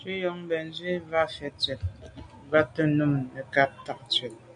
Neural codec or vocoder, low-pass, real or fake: none; 9.9 kHz; real